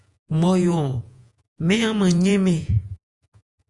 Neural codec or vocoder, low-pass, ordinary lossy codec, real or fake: vocoder, 48 kHz, 128 mel bands, Vocos; 10.8 kHz; Opus, 64 kbps; fake